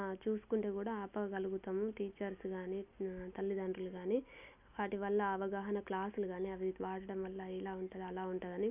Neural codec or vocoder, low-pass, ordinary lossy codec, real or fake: none; 3.6 kHz; none; real